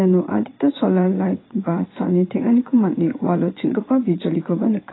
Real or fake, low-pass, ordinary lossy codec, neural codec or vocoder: fake; 7.2 kHz; AAC, 16 kbps; vocoder, 22.05 kHz, 80 mel bands, WaveNeXt